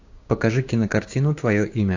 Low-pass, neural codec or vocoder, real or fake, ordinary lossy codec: 7.2 kHz; codec, 16 kHz, 6 kbps, DAC; fake; AAC, 48 kbps